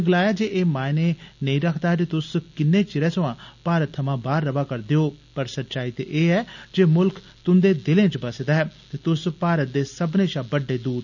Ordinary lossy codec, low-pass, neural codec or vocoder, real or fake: none; 7.2 kHz; none; real